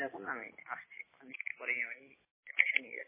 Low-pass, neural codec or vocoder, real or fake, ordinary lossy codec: 3.6 kHz; none; real; MP3, 16 kbps